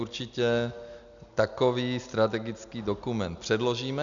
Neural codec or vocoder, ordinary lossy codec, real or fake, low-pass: none; MP3, 96 kbps; real; 7.2 kHz